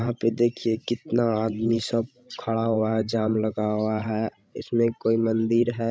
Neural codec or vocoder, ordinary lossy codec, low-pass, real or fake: codec, 16 kHz, 16 kbps, FreqCodec, larger model; none; none; fake